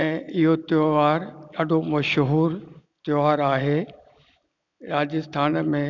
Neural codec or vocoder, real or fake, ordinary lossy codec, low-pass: none; real; none; 7.2 kHz